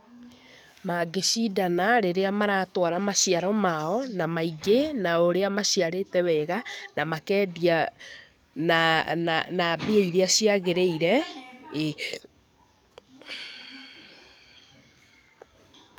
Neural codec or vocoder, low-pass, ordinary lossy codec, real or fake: codec, 44.1 kHz, 7.8 kbps, DAC; none; none; fake